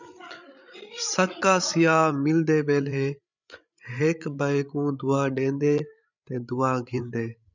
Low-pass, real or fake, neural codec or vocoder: 7.2 kHz; fake; codec, 16 kHz, 8 kbps, FreqCodec, larger model